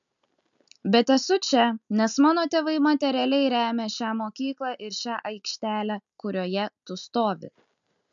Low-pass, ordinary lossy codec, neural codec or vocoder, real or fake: 7.2 kHz; MP3, 96 kbps; none; real